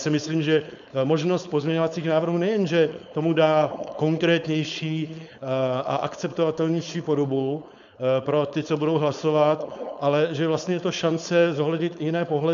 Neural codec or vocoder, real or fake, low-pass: codec, 16 kHz, 4.8 kbps, FACodec; fake; 7.2 kHz